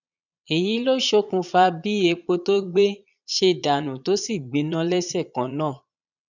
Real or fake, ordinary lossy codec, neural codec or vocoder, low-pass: fake; none; vocoder, 22.05 kHz, 80 mel bands, Vocos; 7.2 kHz